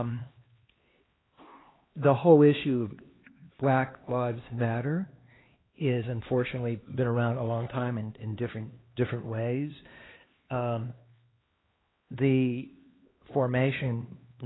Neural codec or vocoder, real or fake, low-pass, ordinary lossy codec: codec, 16 kHz, 2 kbps, X-Codec, HuBERT features, trained on LibriSpeech; fake; 7.2 kHz; AAC, 16 kbps